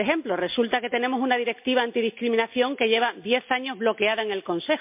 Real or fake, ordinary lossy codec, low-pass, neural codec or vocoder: real; MP3, 32 kbps; 3.6 kHz; none